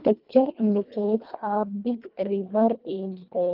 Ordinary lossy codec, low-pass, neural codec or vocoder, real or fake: Opus, 24 kbps; 5.4 kHz; codec, 24 kHz, 1.5 kbps, HILCodec; fake